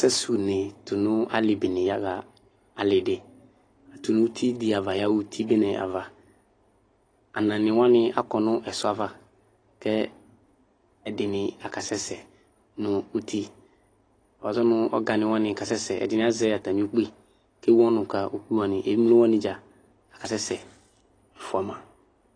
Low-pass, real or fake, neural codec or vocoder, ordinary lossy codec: 9.9 kHz; real; none; AAC, 32 kbps